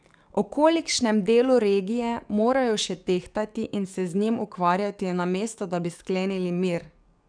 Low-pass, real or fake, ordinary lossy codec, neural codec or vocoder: 9.9 kHz; fake; none; codec, 44.1 kHz, 7.8 kbps, DAC